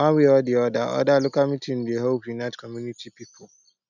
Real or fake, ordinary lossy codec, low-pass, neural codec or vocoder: real; none; 7.2 kHz; none